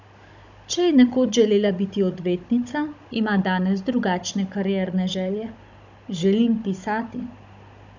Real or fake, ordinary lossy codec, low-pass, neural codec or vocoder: fake; none; 7.2 kHz; codec, 16 kHz, 16 kbps, FunCodec, trained on Chinese and English, 50 frames a second